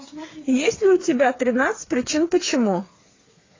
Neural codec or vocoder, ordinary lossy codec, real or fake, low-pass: codec, 16 kHz, 4 kbps, FreqCodec, smaller model; AAC, 32 kbps; fake; 7.2 kHz